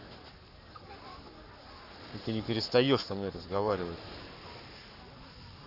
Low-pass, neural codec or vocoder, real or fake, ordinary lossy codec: 5.4 kHz; codec, 44.1 kHz, 7.8 kbps, Pupu-Codec; fake; none